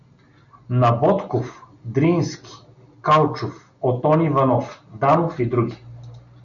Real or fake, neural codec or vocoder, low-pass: real; none; 7.2 kHz